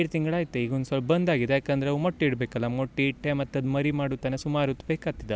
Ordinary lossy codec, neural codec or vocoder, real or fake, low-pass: none; none; real; none